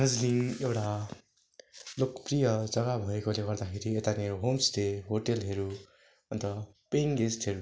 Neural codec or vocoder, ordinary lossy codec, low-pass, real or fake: none; none; none; real